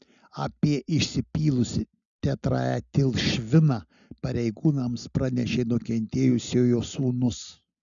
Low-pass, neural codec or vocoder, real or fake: 7.2 kHz; none; real